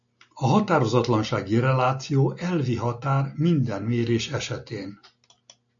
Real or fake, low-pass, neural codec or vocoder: real; 7.2 kHz; none